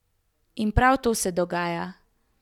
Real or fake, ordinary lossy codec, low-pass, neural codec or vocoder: real; none; 19.8 kHz; none